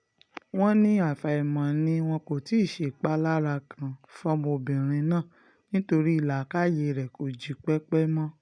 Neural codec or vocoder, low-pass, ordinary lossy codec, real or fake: none; 9.9 kHz; none; real